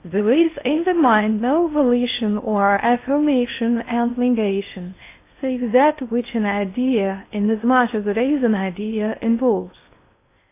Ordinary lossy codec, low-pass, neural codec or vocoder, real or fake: AAC, 24 kbps; 3.6 kHz; codec, 16 kHz in and 24 kHz out, 0.6 kbps, FocalCodec, streaming, 4096 codes; fake